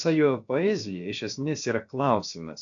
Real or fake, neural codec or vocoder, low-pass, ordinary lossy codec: fake; codec, 16 kHz, about 1 kbps, DyCAST, with the encoder's durations; 7.2 kHz; AAC, 64 kbps